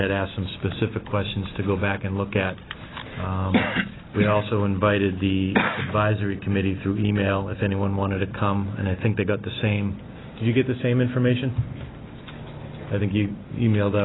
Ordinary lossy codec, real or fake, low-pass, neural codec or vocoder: AAC, 16 kbps; real; 7.2 kHz; none